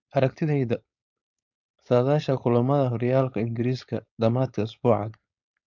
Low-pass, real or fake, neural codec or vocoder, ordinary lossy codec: 7.2 kHz; fake; codec, 16 kHz, 4.8 kbps, FACodec; MP3, 64 kbps